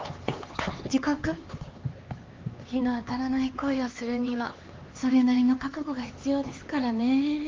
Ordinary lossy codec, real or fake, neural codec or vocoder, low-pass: Opus, 32 kbps; fake; codec, 16 kHz, 4 kbps, X-Codec, HuBERT features, trained on LibriSpeech; 7.2 kHz